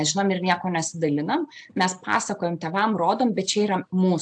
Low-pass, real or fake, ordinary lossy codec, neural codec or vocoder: 9.9 kHz; real; AAC, 64 kbps; none